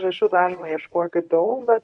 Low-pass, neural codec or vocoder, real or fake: 10.8 kHz; codec, 24 kHz, 0.9 kbps, WavTokenizer, medium speech release version 1; fake